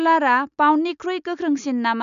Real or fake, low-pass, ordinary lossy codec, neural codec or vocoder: real; 7.2 kHz; none; none